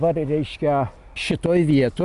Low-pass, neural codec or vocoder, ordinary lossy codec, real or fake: 10.8 kHz; none; Opus, 64 kbps; real